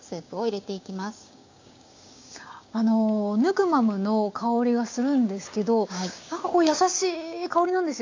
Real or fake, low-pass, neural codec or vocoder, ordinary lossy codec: fake; 7.2 kHz; codec, 16 kHz, 6 kbps, DAC; AAC, 48 kbps